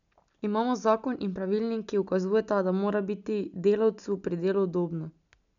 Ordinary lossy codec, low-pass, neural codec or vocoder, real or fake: none; 7.2 kHz; none; real